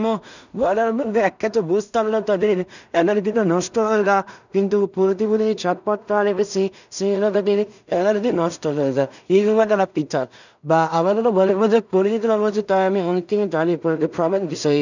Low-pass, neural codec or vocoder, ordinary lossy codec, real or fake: 7.2 kHz; codec, 16 kHz in and 24 kHz out, 0.4 kbps, LongCat-Audio-Codec, two codebook decoder; none; fake